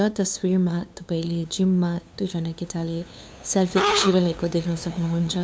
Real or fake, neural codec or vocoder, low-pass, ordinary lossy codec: fake; codec, 16 kHz, 2 kbps, FunCodec, trained on LibriTTS, 25 frames a second; none; none